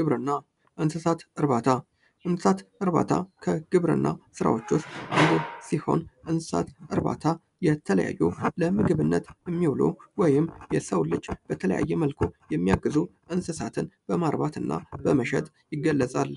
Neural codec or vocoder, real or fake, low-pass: none; real; 10.8 kHz